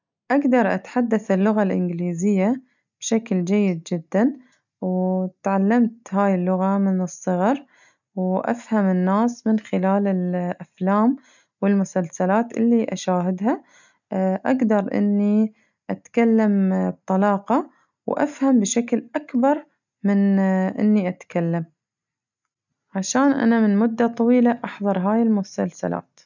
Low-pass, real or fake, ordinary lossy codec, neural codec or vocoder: 7.2 kHz; real; none; none